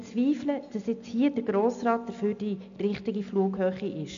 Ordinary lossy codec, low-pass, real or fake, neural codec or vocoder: MP3, 64 kbps; 7.2 kHz; real; none